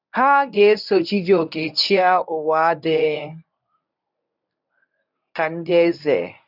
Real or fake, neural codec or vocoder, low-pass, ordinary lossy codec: fake; codec, 24 kHz, 0.9 kbps, WavTokenizer, medium speech release version 1; 5.4 kHz; none